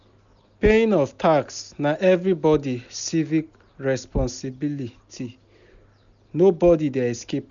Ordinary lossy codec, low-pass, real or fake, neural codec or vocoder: none; 7.2 kHz; real; none